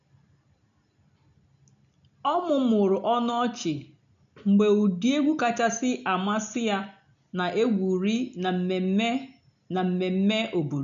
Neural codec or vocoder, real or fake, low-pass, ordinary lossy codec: none; real; 7.2 kHz; none